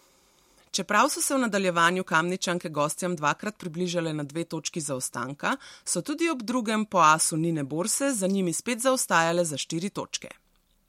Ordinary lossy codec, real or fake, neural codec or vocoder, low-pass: MP3, 64 kbps; real; none; 19.8 kHz